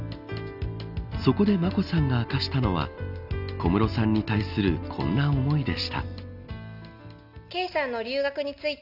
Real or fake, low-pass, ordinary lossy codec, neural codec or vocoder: real; 5.4 kHz; none; none